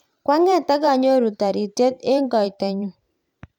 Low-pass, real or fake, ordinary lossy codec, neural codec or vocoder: 19.8 kHz; fake; none; vocoder, 44.1 kHz, 128 mel bands every 512 samples, BigVGAN v2